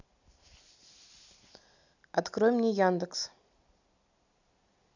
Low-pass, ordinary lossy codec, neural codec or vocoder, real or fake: 7.2 kHz; none; none; real